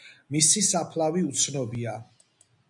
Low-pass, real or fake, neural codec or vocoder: 10.8 kHz; real; none